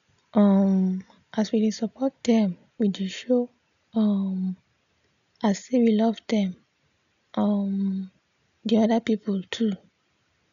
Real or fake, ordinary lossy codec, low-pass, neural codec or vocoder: real; none; 7.2 kHz; none